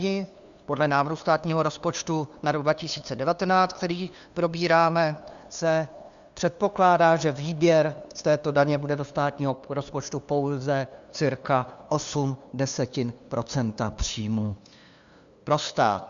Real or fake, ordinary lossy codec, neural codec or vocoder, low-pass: fake; Opus, 64 kbps; codec, 16 kHz, 2 kbps, FunCodec, trained on LibriTTS, 25 frames a second; 7.2 kHz